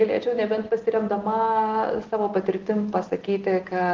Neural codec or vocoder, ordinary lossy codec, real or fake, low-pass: none; Opus, 16 kbps; real; 7.2 kHz